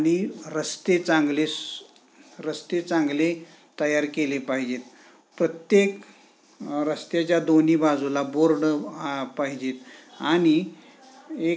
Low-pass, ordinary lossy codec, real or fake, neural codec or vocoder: none; none; real; none